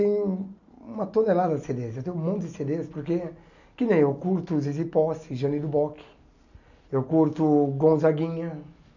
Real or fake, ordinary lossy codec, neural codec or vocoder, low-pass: real; none; none; 7.2 kHz